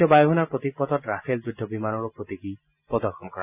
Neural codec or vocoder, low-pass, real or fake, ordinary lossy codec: none; 3.6 kHz; real; none